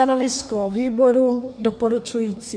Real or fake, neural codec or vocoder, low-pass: fake; codec, 24 kHz, 1 kbps, SNAC; 9.9 kHz